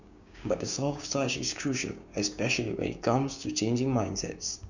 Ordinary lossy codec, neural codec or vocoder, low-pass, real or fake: none; codec, 16 kHz, 6 kbps, DAC; 7.2 kHz; fake